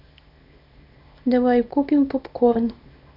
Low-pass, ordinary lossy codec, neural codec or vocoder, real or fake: 5.4 kHz; none; codec, 24 kHz, 0.9 kbps, WavTokenizer, small release; fake